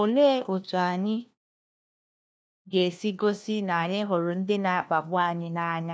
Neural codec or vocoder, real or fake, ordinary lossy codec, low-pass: codec, 16 kHz, 1 kbps, FunCodec, trained on LibriTTS, 50 frames a second; fake; none; none